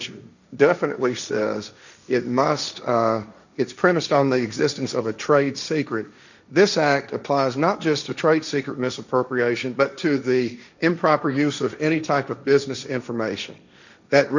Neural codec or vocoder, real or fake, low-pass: codec, 16 kHz, 1.1 kbps, Voila-Tokenizer; fake; 7.2 kHz